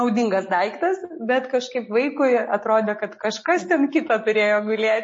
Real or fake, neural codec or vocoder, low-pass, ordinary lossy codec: real; none; 10.8 kHz; MP3, 32 kbps